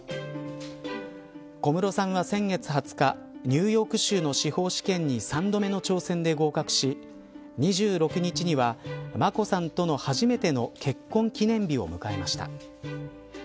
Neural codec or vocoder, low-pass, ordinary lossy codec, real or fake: none; none; none; real